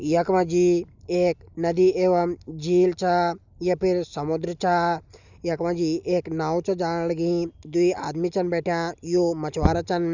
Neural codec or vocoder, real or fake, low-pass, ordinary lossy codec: none; real; 7.2 kHz; none